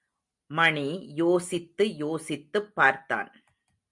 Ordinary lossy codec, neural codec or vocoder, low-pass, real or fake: MP3, 64 kbps; none; 10.8 kHz; real